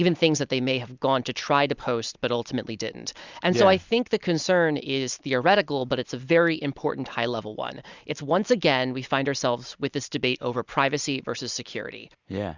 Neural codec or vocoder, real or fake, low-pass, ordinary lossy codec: none; real; 7.2 kHz; Opus, 64 kbps